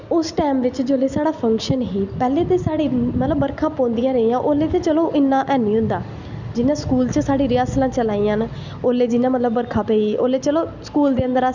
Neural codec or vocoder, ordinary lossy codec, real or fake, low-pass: none; none; real; 7.2 kHz